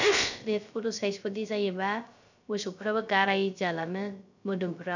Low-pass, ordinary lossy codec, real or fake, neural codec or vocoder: 7.2 kHz; none; fake; codec, 16 kHz, 0.3 kbps, FocalCodec